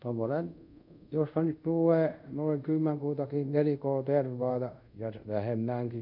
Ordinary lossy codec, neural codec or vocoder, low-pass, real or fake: none; codec, 24 kHz, 0.5 kbps, DualCodec; 5.4 kHz; fake